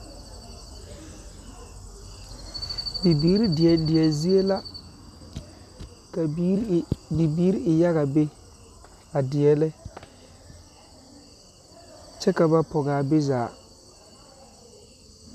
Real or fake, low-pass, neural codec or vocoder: real; 14.4 kHz; none